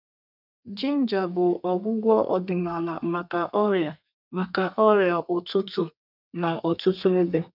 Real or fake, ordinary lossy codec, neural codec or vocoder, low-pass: fake; none; codec, 32 kHz, 1.9 kbps, SNAC; 5.4 kHz